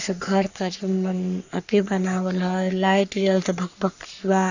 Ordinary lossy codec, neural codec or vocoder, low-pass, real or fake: none; codec, 44.1 kHz, 3.4 kbps, Pupu-Codec; 7.2 kHz; fake